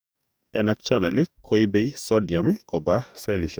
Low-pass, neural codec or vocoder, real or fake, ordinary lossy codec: none; codec, 44.1 kHz, 2.6 kbps, DAC; fake; none